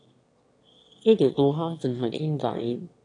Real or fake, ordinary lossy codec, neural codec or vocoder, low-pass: fake; AAC, 48 kbps; autoencoder, 22.05 kHz, a latent of 192 numbers a frame, VITS, trained on one speaker; 9.9 kHz